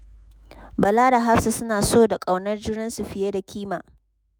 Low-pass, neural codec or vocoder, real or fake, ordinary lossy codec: none; autoencoder, 48 kHz, 128 numbers a frame, DAC-VAE, trained on Japanese speech; fake; none